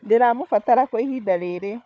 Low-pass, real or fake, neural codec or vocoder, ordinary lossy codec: none; fake; codec, 16 kHz, 4 kbps, FunCodec, trained on Chinese and English, 50 frames a second; none